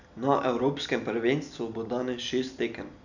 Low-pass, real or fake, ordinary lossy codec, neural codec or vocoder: 7.2 kHz; real; none; none